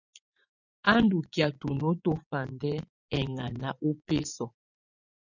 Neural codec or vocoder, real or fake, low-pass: vocoder, 24 kHz, 100 mel bands, Vocos; fake; 7.2 kHz